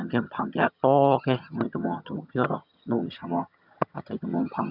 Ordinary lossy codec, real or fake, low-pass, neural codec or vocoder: none; fake; 5.4 kHz; vocoder, 22.05 kHz, 80 mel bands, HiFi-GAN